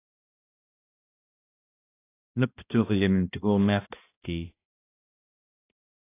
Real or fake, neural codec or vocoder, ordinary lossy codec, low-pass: fake; codec, 16 kHz, 1 kbps, X-Codec, HuBERT features, trained on balanced general audio; AAC, 24 kbps; 3.6 kHz